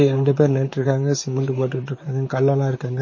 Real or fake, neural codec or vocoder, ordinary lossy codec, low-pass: fake; vocoder, 44.1 kHz, 128 mel bands, Pupu-Vocoder; MP3, 32 kbps; 7.2 kHz